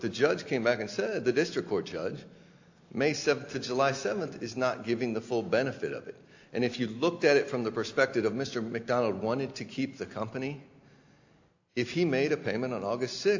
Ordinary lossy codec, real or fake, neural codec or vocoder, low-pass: AAC, 48 kbps; real; none; 7.2 kHz